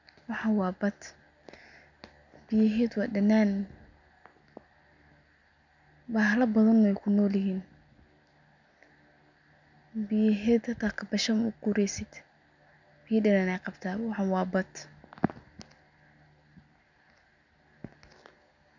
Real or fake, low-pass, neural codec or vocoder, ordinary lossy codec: real; 7.2 kHz; none; none